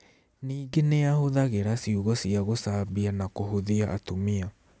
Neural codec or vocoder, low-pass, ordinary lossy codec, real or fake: none; none; none; real